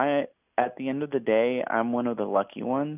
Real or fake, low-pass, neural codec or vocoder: real; 3.6 kHz; none